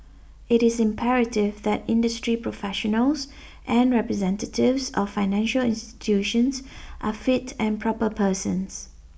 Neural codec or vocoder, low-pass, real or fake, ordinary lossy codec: none; none; real; none